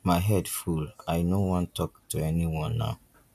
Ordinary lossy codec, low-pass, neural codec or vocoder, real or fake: none; 14.4 kHz; none; real